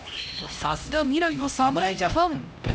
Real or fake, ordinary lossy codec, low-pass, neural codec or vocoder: fake; none; none; codec, 16 kHz, 1 kbps, X-Codec, HuBERT features, trained on LibriSpeech